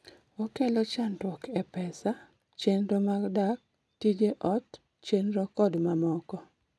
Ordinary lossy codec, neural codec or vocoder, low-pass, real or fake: none; none; none; real